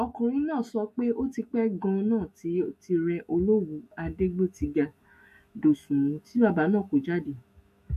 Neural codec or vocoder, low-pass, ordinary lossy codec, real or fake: autoencoder, 48 kHz, 128 numbers a frame, DAC-VAE, trained on Japanese speech; 14.4 kHz; MP3, 64 kbps; fake